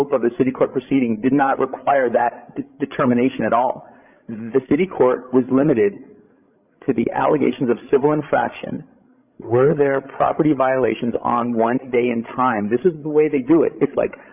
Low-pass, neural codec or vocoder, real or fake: 3.6 kHz; codec, 16 kHz, 16 kbps, FreqCodec, larger model; fake